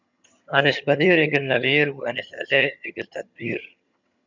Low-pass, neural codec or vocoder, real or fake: 7.2 kHz; vocoder, 22.05 kHz, 80 mel bands, HiFi-GAN; fake